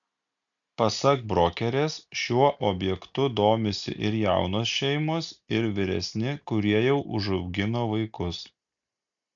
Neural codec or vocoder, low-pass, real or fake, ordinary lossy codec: none; 7.2 kHz; real; MP3, 96 kbps